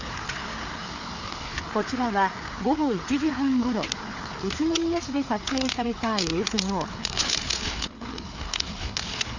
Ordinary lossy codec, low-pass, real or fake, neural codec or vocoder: none; 7.2 kHz; fake; codec, 16 kHz, 4 kbps, FreqCodec, larger model